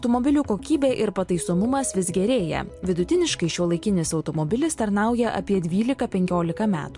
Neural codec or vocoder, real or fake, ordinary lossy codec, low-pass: none; real; MP3, 64 kbps; 10.8 kHz